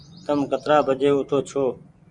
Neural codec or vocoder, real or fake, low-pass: vocoder, 24 kHz, 100 mel bands, Vocos; fake; 10.8 kHz